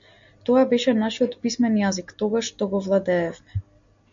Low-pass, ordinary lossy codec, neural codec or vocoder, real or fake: 7.2 kHz; MP3, 48 kbps; none; real